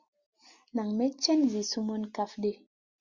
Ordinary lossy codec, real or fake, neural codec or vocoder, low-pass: Opus, 64 kbps; real; none; 7.2 kHz